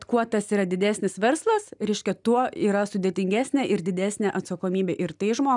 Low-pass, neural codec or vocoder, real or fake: 10.8 kHz; none; real